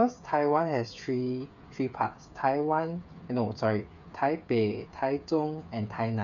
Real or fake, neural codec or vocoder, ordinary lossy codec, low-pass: fake; codec, 16 kHz, 8 kbps, FreqCodec, smaller model; none; 7.2 kHz